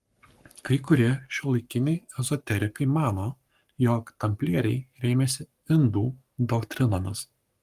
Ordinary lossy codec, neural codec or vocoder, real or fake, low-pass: Opus, 32 kbps; codec, 44.1 kHz, 7.8 kbps, Pupu-Codec; fake; 14.4 kHz